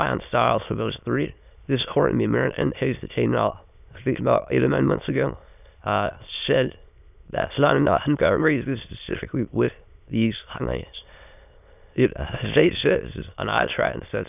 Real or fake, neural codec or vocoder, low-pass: fake; autoencoder, 22.05 kHz, a latent of 192 numbers a frame, VITS, trained on many speakers; 3.6 kHz